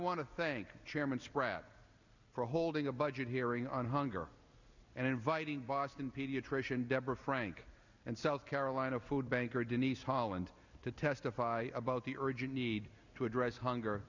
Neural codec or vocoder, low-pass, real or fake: none; 7.2 kHz; real